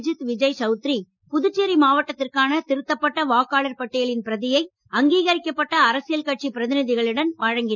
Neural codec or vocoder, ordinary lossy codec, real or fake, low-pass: none; none; real; none